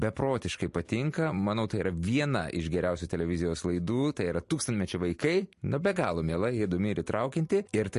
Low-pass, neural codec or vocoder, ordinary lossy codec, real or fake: 14.4 kHz; none; MP3, 48 kbps; real